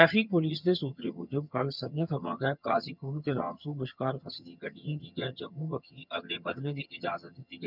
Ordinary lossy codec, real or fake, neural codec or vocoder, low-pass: none; fake; vocoder, 22.05 kHz, 80 mel bands, HiFi-GAN; 5.4 kHz